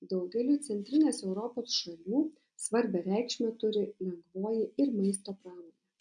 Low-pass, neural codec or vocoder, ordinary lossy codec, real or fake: 9.9 kHz; none; MP3, 96 kbps; real